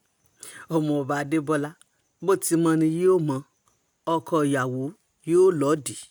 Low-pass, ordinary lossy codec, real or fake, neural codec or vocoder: none; none; real; none